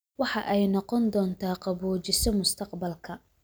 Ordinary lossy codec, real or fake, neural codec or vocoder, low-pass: none; real; none; none